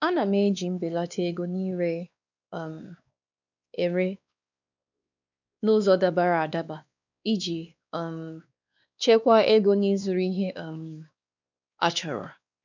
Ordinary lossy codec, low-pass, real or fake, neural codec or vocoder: none; 7.2 kHz; fake; codec, 16 kHz, 2 kbps, X-Codec, WavLM features, trained on Multilingual LibriSpeech